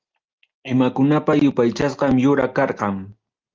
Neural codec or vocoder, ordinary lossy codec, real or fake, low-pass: none; Opus, 24 kbps; real; 7.2 kHz